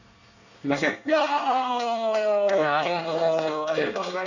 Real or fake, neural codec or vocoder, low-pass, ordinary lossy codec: fake; codec, 24 kHz, 1 kbps, SNAC; 7.2 kHz; none